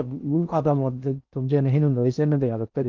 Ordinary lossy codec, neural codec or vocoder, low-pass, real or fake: Opus, 32 kbps; codec, 16 kHz in and 24 kHz out, 0.6 kbps, FocalCodec, streaming, 4096 codes; 7.2 kHz; fake